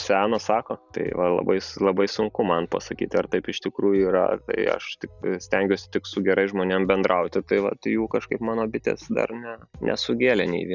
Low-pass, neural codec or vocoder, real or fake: 7.2 kHz; none; real